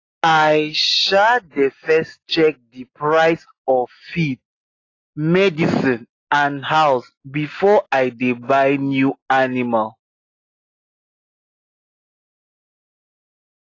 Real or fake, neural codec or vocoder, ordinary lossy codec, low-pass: real; none; AAC, 32 kbps; 7.2 kHz